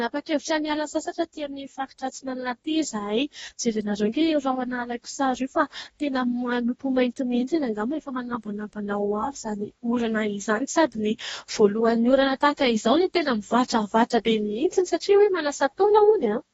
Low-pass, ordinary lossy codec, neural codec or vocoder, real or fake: 19.8 kHz; AAC, 24 kbps; codec, 44.1 kHz, 2.6 kbps, DAC; fake